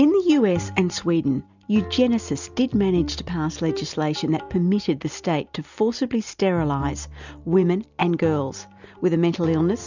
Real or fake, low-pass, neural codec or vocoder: real; 7.2 kHz; none